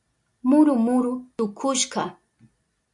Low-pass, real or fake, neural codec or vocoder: 10.8 kHz; real; none